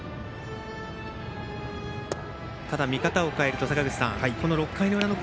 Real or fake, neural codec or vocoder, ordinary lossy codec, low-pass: real; none; none; none